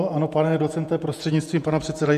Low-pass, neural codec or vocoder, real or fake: 14.4 kHz; vocoder, 48 kHz, 128 mel bands, Vocos; fake